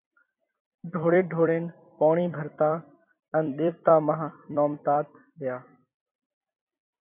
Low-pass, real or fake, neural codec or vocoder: 3.6 kHz; fake; vocoder, 44.1 kHz, 128 mel bands every 256 samples, BigVGAN v2